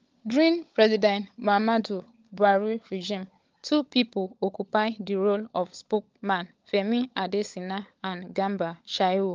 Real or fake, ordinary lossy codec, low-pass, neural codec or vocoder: fake; Opus, 32 kbps; 7.2 kHz; codec, 16 kHz, 16 kbps, FunCodec, trained on LibriTTS, 50 frames a second